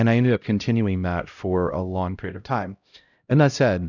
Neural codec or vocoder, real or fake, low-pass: codec, 16 kHz, 0.5 kbps, X-Codec, HuBERT features, trained on LibriSpeech; fake; 7.2 kHz